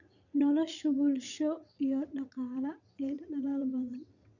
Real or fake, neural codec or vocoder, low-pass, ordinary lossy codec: fake; vocoder, 22.05 kHz, 80 mel bands, WaveNeXt; 7.2 kHz; none